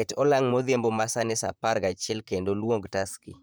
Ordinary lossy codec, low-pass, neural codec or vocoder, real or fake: none; none; vocoder, 44.1 kHz, 128 mel bands, Pupu-Vocoder; fake